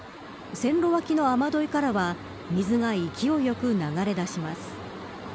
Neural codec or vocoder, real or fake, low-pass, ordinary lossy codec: none; real; none; none